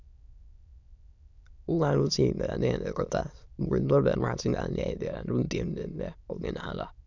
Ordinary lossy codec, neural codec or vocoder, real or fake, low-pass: none; autoencoder, 22.05 kHz, a latent of 192 numbers a frame, VITS, trained on many speakers; fake; 7.2 kHz